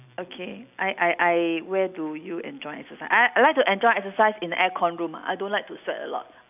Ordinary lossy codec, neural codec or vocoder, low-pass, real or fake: none; none; 3.6 kHz; real